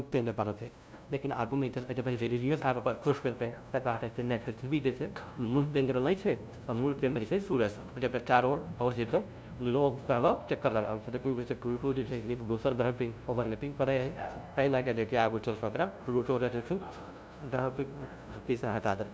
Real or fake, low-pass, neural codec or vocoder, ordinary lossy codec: fake; none; codec, 16 kHz, 0.5 kbps, FunCodec, trained on LibriTTS, 25 frames a second; none